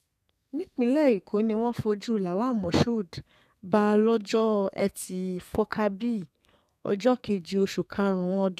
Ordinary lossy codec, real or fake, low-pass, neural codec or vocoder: none; fake; 14.4 kHz; codec, 32 kHz, 1.9 kbps, SNAC